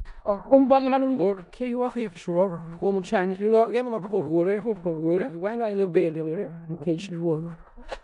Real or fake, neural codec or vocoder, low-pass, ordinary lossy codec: fake; codec, 16 kHz in and 24 kHz out, 0.4 kbps, LongCat-Audio-Codec, four codebook decoder; 10.8 kHz; none